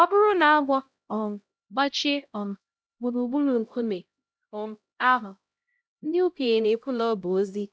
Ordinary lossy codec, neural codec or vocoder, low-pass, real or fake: none; codec, 16 kHz, 0.5 kbps, X-Codec, HuBERT features, trained on LibriSpeech; none; fake